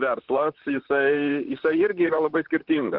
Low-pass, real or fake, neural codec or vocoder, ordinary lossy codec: 5.4 kHz; fake; vocoder, 44.1 kHz, 128 mel bands, Pupu-Vocoder; Opus, 16 kbps